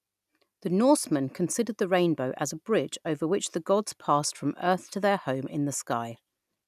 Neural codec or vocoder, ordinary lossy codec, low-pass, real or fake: none; none; 14.4 kHz; real